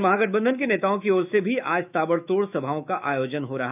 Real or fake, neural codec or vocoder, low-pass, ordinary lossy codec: fake; autoencoder, 48 kHz, 128 numbers a frame, DAC-VAE, trained on Japanese speech; 3.6 kHz; none